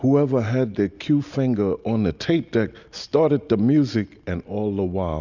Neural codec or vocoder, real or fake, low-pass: none; real; 7.2 kHz